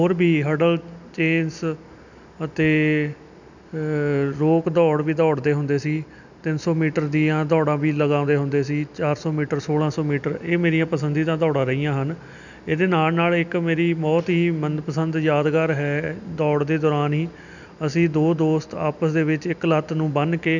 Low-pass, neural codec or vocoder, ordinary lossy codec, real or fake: 7.2 kHz; none; none; real